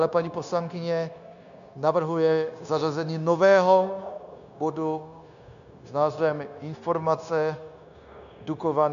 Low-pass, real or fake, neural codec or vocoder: 7.2 kHz; fake; codec, 16 kHz, 0.9 kbps, LongCat-Audio-Codec